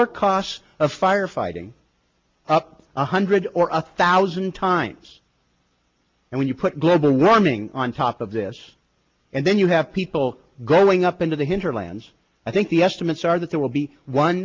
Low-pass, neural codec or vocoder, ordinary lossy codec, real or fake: 7.2 kHz; none; Opus, 32 kbps; real